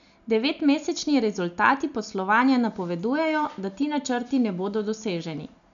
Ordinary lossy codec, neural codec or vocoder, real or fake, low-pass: none; none; real; 7.2 kHz